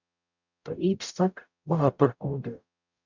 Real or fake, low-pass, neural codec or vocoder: fake; 7.2 kHz; codec, 44.1 kHz, 0.9 kbps, DAC